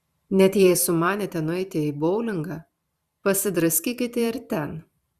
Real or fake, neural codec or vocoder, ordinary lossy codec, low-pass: fake; vocoder, 44.1 kHz, 128 mel bands every 512 samples, BigVGAN v2; Opus, 64 kbps; 14.4 kHz